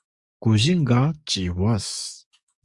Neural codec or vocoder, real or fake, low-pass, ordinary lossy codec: codec, 44.1 kHz, 7.8 kbps, DAC; fake; 10.8 kHz; Opus, 64 kbps